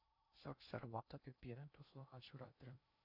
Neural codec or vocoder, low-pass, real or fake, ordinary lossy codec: codec, 16 kHz in and 24 kHz out, 0.6 kbps, FocalCodec, streaming, 2048 codes; 5.4 kHz; fake; AAC, 48 kbps